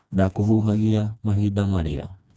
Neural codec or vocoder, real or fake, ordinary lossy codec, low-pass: codec, 16 kHz, 2 kbps, FreqCodec, smaller model; fake; none; none